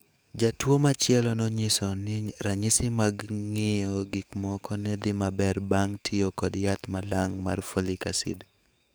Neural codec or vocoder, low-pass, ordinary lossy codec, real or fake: codec, 44.1 kHz, 7.8 kbps, DAC; none; none; fake